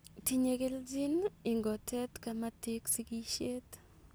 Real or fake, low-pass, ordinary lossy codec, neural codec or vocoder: real; none; none; none